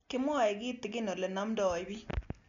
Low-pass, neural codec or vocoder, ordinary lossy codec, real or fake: 7.2 kHz; none; none; real